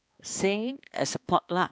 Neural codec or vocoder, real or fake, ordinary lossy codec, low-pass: codec, 16 kHz, 2 kbps, X-Codec, HuBERT features, trained on balanced general audio; fake; none; none